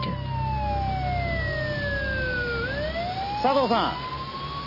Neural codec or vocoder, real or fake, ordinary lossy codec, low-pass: none; real; none; 5.4 kHz